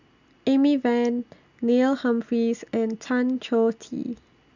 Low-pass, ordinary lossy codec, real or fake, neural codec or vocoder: 7.2 kHz; none; real; none